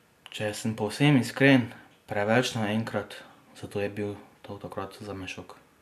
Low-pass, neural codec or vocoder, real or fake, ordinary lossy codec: 14.4 kHz; none; real; none